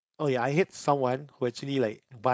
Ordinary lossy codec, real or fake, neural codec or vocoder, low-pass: none; fake; codec, 16 kHz, 4.8 kbps, FACodec; none